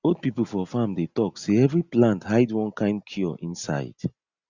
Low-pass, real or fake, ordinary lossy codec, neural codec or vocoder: 7.2 kHz; real; Opus, 64 kbps; none